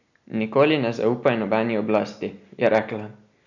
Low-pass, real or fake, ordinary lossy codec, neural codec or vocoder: 7.2 kHz; real; none; none